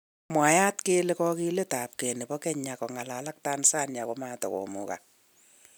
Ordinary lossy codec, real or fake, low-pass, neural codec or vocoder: none; real; none; none